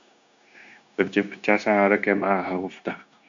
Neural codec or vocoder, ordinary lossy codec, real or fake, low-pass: codec, 16 kHz, 0.9 kbps, LongCat-Audio-Codec; AAC, 64 kbps; fake; 7.2 kHz